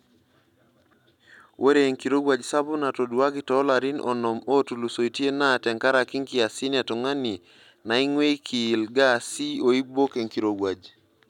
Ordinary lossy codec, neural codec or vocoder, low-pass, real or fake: none; none; 19.8 kHz; real